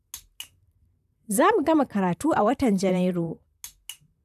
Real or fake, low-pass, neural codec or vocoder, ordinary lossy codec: fake; 14.4 kHz; vocoder, 44.1 kHz, 128 mel bands, Pupu-Vocoder; none